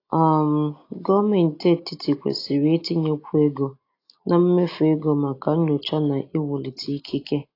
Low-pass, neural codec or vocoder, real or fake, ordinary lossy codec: 5.4 kHz; none; real; AAC, 32 kbps